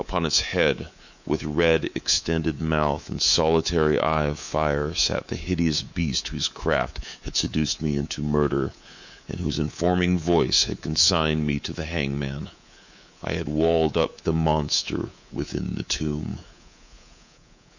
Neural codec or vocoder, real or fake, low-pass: codec, 24 kHz, 3.1 kbps, DualCodec; fake; 7.2 kHz